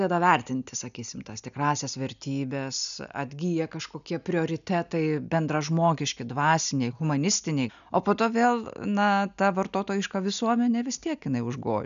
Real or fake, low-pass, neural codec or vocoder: real; 7.2 kHz; none